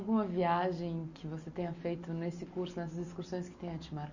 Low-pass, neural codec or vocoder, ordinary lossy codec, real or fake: 7.2 kHz; none; none; real